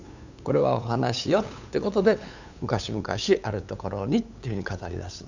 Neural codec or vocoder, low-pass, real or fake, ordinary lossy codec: codec, 16 kHz, 8 kbps, FunCodec, trained on LibriTTS, 25 frames a second; 7.2 kHz; fake; none